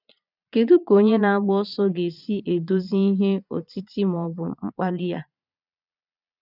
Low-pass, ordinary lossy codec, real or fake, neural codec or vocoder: 5.4 kHz; none; fake; vocoder, 22.05 kHz, 80 mel bands, Vocos